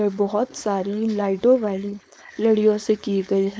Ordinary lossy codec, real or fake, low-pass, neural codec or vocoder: none; fake; none; codec, 16 kHz, 4.8 kbps, FACodec